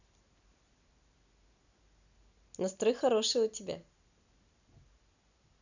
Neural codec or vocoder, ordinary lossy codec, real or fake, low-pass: none; none; real; 7.2 kHz